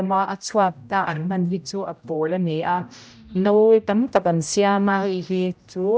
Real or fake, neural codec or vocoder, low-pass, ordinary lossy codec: fake; codec, 16 kHz, 0.5 kbps, X-Codec, HuBERT features, trained on general audio; none; none